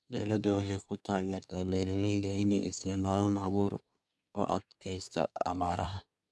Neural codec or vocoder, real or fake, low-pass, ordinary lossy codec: codec, 24 kHz, 1 kbps, SNAC; fake; none; none